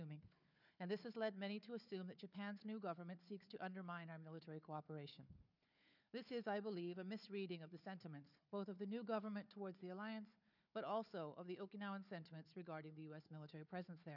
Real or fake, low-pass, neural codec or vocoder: fake; 5.4 kHz; codec, 16 kHz, 4 kbps, FunCodec, trained on Chinese and English, 50 frames a second